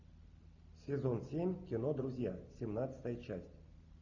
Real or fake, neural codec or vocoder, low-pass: real; none; 7.2 kHz